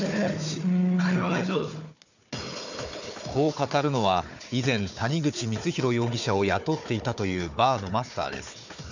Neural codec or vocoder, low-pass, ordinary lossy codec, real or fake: codec, 16 kHz, 4 kbps, FunCodec, trained on Chinese and English, 50 frames a second; 7.2 kHz; none; fake